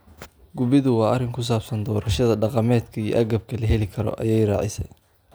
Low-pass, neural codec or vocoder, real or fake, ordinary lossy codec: none; none; real; none